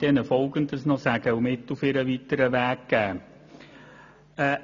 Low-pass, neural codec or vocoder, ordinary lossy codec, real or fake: 7.2 kHz; none; none; real